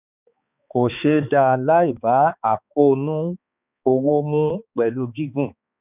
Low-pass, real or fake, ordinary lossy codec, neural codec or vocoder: 3.6 kHz; fake; none; codec, 16 kHz, 4 kbps, X-Codec, HuBERT features, trained on balanced general audio